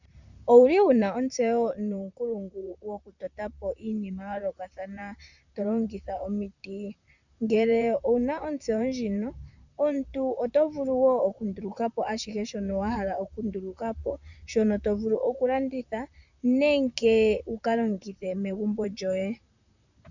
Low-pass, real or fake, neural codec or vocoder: 7.2 kHz; fake; vocoder, 44.1 kHz, 128 mel bands, Pupu-Vocoder